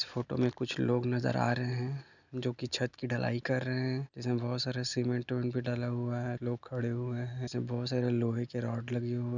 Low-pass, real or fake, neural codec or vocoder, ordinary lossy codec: 7.2 kHz; real; none; none